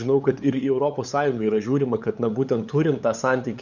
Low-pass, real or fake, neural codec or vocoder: 7.2 kHz; fake; codec, 16 kHz, 8 kbps, FunCodec, trained on LibriTTS, 25 frames a second